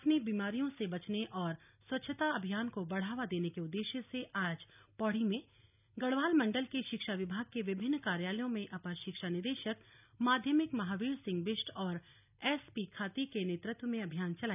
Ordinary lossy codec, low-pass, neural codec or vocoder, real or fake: none; 3.6 kHz; none; real